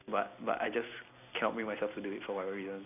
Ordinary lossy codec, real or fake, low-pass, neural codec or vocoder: none; real; 3.6 kHz; none